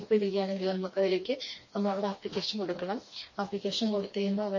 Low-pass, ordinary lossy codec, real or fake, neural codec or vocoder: 7.2 kHz; MP3, 32 kbps; fake; codec, 16 kHz, 2 kbps, FreqCodec, smaller model